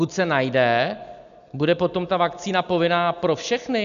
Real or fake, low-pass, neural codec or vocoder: real; 7.2 kHz; none